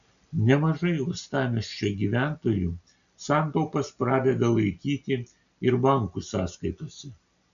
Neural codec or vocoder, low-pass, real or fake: none; 7.2 kHz; real